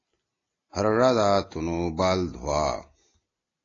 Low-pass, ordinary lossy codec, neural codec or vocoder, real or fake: 7.2 kHz; AAC, 32 kbps; none; real